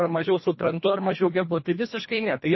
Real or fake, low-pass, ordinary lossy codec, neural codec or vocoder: fake; 7.2 kHz; MP3, 24 kbps; codec, 24 kHz, 1.5 kbps, HILCodec